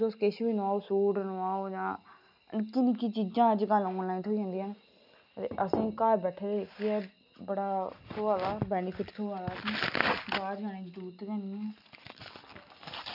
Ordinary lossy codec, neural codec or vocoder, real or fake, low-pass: none; none; real; 5.4 kHz